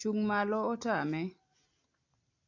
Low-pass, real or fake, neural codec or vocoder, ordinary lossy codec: 7.2 kHz; real; none; AAC, 48 kbps